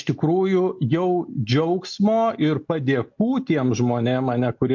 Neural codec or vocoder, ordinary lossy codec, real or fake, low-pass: none; MP3, 48 kbps; real; 7.2 kHz